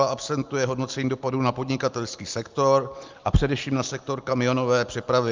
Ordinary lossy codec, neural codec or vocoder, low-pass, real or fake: Opus, 24 kbps; none; 7.2 kHz; real